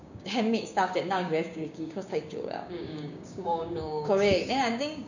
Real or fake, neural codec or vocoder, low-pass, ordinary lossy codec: fake; codec, 16 kHz, 6 kbps, DAC; 7.2 kHz; none